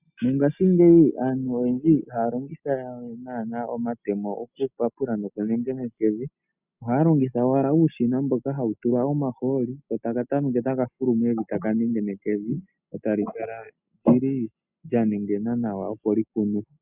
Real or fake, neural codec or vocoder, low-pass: real; none; 3.6 kHz